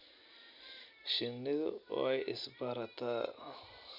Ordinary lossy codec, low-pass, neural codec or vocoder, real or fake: none; 5.4 kHz; none; real